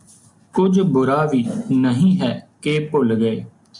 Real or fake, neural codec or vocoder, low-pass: real; none; 10.8 kHz